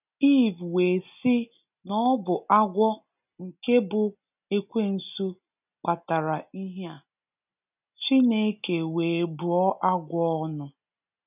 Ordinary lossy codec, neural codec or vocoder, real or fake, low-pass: none; none; real; 3.6 kHz